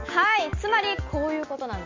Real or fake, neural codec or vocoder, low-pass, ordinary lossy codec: real; none; 7.2 kHz; MP3, 48 kbps